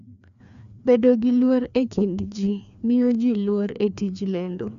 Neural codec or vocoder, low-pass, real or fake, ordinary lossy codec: codec, 16 kHz, 2 kbps, FreqCodec, larger model; 7.2 kHz; fake; none